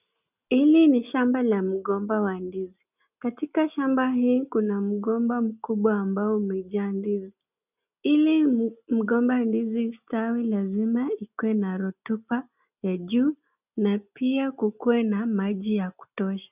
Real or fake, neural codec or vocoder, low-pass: real; none; 3.6 kHz